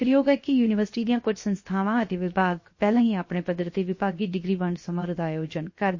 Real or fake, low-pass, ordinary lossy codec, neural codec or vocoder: fake; 7.2 kHz; MP3, 32 kbps; codec, 16 kHz, 0.7 kbps, FocalCodec